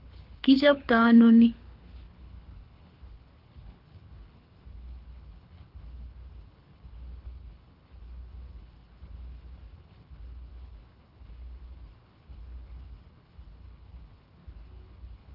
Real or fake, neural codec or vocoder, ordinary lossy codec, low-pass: fake; codec, 24 kHz, 6 kbps, HILCodec; Opus, 32 kbps; 5.4 kHz